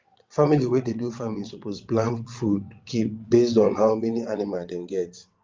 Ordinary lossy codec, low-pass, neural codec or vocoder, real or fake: Opus, 32 kbps; 7.2 kHz; vocoder, 22.05 kHz, 80 mel bands, WaveNeXt; fake